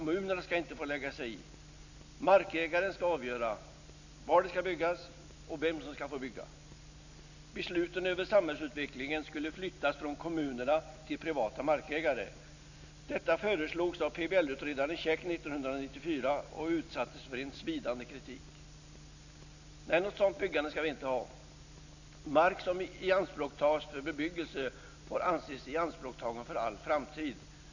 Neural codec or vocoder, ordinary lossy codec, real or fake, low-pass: none; none; real; 7.2 kHz